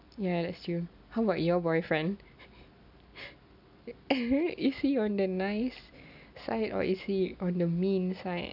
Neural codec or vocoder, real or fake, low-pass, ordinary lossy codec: none; real; 5.4 kHz; none